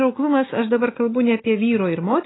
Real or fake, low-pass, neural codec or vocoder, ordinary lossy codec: real; 7.2 kHz; none; AAC, 16 kbps